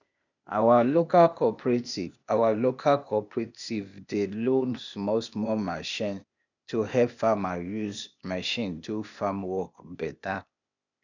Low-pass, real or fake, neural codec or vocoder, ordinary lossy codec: 7.2 kHz; fake; codec, 16 kHz, 0.8 kbps, ZipCodec; none